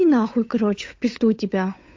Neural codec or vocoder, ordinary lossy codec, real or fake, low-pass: codec, 16 kHz, 8 kbps, FunCodec, trained on Chinese and English, 25 frames a second; MP3, 48 kbps; fake; 7.2 kHz